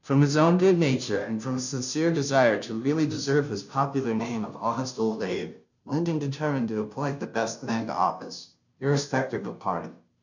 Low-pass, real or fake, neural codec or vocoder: 7.2 kHz; fake; codec, 16 kHz, 0.5 kbps, FunCodec, trained on Chinese and English, 25 frames a second